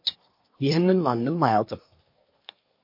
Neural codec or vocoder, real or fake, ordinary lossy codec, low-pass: codec, 24 kHz, 1 kbps, SNAC; fake; MP3, 32 kbps; 5.4 kHz